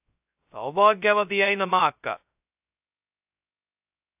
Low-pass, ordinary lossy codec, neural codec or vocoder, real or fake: 3.6 kHz; AAC, 32 kbps; codec, 16 kHz, 0.2 kbps, FocalCodec; fake